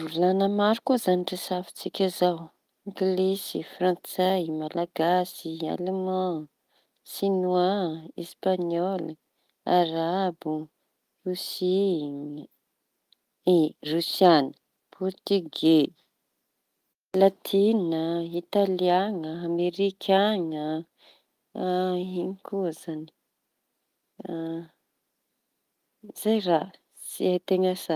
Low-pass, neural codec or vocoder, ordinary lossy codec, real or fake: 14.4 kHz; none; Opus, 32 kbps; real